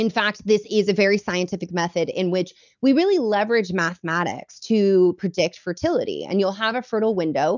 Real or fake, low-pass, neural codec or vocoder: real; 7.2 kHz; none